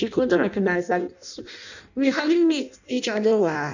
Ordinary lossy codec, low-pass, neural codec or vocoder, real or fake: none; 7.2 kHz; codec, 16 kHz in and 24 kHz out, 0.6 kbps, FireRedTTS-2 codec; fake